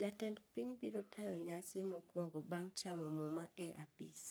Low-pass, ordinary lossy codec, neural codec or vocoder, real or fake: none; none; codec, 44.1 kHz, 3.4 kbps, Pupu-Codec; fake